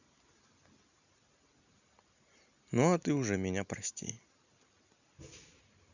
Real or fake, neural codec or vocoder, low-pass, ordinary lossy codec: real; none; 7.2 kHz; none